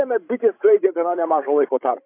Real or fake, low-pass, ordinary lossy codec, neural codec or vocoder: fake; 3.6 kHz; AAC, 24 kbps; codec, 16 kHz, 16 kbps, FreqCodec, larger model